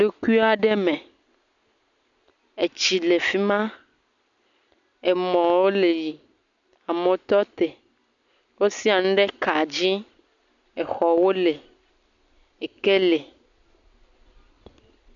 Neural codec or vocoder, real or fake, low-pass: none; real; 7.2 kHz